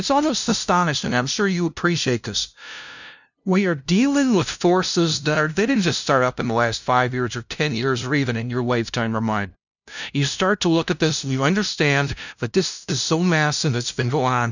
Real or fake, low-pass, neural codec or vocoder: fake; 7.2 kHz; codec, 16 kHz, 0.5 kbps, FunCodec, trained on LibriTTS, 25 frames a second